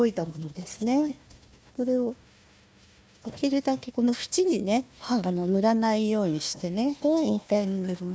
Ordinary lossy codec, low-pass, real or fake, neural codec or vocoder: none; none; fake; codec, 16 kHz, 1 kbps, FunCodec, trained on Chinese and English, 50 frames a second